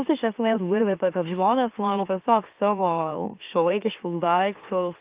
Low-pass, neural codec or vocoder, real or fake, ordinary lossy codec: 3.6 kHz; autoencoder, 44.1 kHz, a latent of 192 numbers a frame, MeloTTS; fake; Opus, 24 kbps